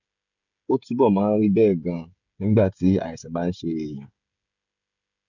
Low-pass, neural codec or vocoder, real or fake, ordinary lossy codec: 7.2 kHz; codec, 16 kHz, 16 kbps, FreqCodec, smaller model; fake; none